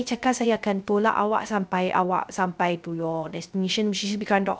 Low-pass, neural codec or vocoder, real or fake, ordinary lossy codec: none; codec, 16 kHz, 0.3 kbps, FocalCodec; fake; none